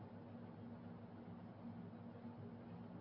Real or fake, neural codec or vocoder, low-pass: real; none; 5.4 kHz